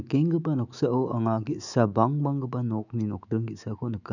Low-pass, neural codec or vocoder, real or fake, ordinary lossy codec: 7.2 kHz; autoencoder, 48 kHz, 128 numbers a frame, DAC-VAE, trained on Japanese speech; fake; none